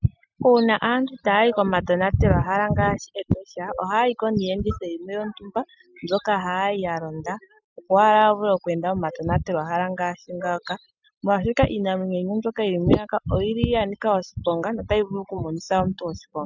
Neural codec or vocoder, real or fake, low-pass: none; real; 7.2 kHz